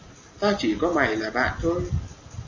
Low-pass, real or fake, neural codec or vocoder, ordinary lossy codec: 7.2 kHz; real; none; MP3, 32 kbps